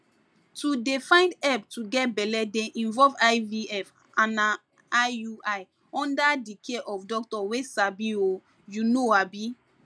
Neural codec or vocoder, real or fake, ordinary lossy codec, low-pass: none; real; none; none